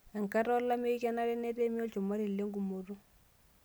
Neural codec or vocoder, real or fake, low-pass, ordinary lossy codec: none; real; none; none